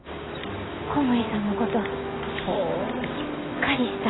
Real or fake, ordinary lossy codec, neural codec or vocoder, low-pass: real; AAC, 16 kbps; none; 7.2 kHz